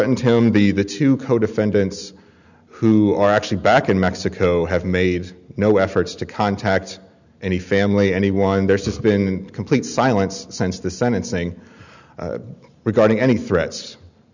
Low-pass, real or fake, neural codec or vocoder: 7.2 kHz; real; none